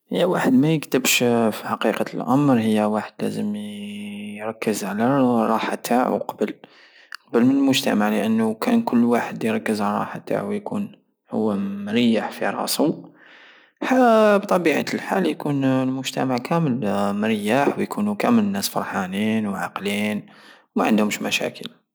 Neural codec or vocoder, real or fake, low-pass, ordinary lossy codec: none; real; none; none